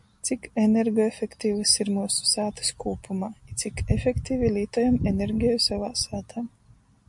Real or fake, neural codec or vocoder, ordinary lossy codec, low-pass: real; none; MP3, 64 kbps; 10.8 kHz